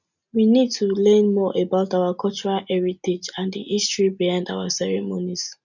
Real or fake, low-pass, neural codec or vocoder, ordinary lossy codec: real; 7.2 kHz; none; none